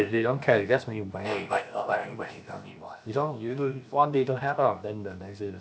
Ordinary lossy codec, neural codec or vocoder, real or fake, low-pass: none; codec, 16 kHz, 0.7 kbps, FocalCodec; fake; none